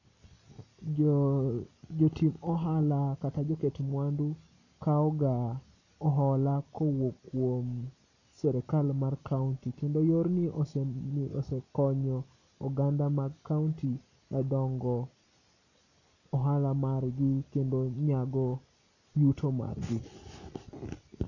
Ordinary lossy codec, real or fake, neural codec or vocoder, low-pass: none; real; none; 7.2 kHz